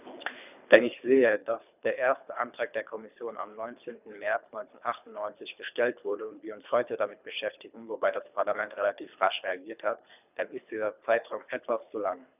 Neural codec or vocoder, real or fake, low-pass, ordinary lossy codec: codec, 24 kHz, 3 kbps, HILCodec; fake; 3.6 kHz; none